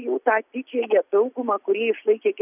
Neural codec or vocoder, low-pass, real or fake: none; 3.6 kHz; real